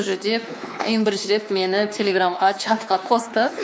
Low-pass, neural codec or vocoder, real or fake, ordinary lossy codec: none; codec, 16 kHz, 2 kbps, X-Codec, WavLM features, trained on Multilingual LibriSpeech; fake; none